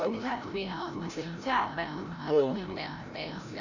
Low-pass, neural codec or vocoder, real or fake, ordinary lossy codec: 7.2 kHz; codec, 16 kHz, 0.5 kbps, FreqCodec, larger model; fake; none